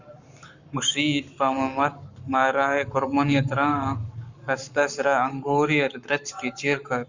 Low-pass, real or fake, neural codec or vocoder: 7.2 kHz; fake; codec, 44.1 kHz, 7.8 kbps, Pupu-Codec